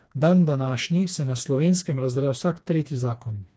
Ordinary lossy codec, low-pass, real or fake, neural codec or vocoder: none; none; fake; codec, 16 kHz, 2 kbps, FreqCodec, smaller model